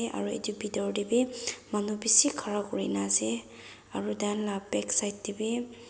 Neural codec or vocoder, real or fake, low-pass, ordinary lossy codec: none; real; none; none